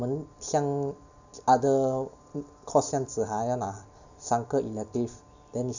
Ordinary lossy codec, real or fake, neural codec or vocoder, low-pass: none; real; none; 7.2 kHz